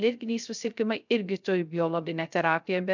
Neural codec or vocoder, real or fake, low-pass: codec, 16 kHz, 0.3 kbps, FocalCodec; fake; 7.2 kHz